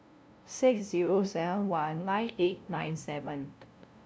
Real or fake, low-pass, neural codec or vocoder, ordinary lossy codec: fake; none; codec, 16 kHz, 0.5 kbps, FunCodec, trained on LibriTTS, 25 frames a second; none